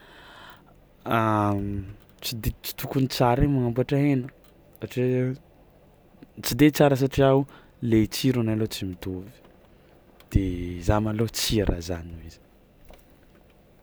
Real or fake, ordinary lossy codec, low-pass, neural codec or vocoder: real; none; none; none